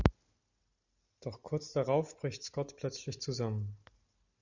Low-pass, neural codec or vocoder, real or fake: 7.2 kHz; none; real